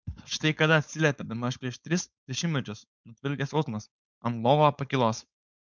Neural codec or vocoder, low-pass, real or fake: codec, 16 kHz, 4.8 kbps, FACodec; 7.2 kHz; fake